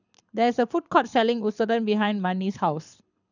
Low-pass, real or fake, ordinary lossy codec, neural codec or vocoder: 7.2 kHz; fake; none; codec, 24 kHz, 6 kbps, HILCodec